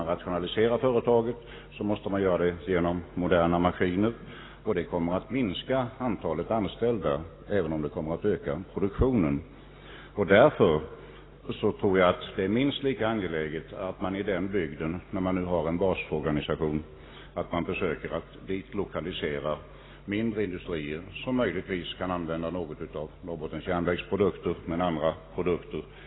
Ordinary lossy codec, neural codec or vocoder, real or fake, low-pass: AAC, 16 kbps; none; real; 7.2 kHz